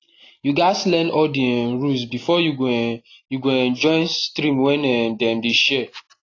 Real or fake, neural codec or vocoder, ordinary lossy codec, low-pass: real; none; AAC, 32 kbps; 7.2 kHz